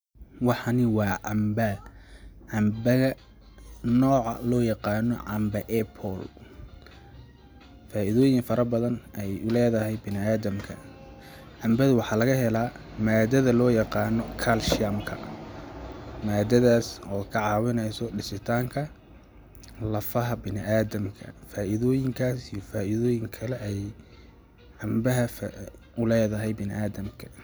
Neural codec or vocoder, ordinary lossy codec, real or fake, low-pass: none; none; real; none